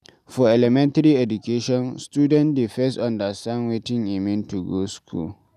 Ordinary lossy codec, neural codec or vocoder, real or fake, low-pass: none; none; real; 14.4 kHz